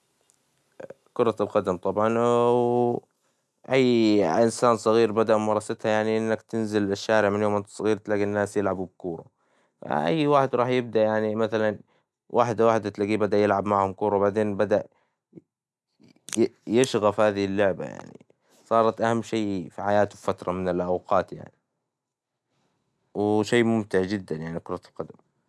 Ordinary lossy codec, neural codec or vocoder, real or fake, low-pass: none; none; real; none